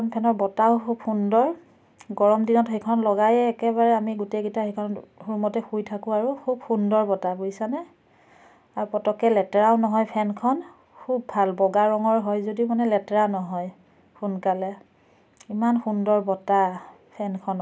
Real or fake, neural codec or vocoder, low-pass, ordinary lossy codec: real; none; none; none